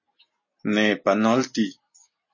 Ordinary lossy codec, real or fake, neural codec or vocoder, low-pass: MP3, 32 kbps; real; none; 7.2 kHz